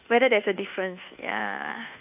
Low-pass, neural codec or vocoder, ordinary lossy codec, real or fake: 3.6 kHz; codec, 24 kHz, 1.2 kbps, DualCodec; none; fake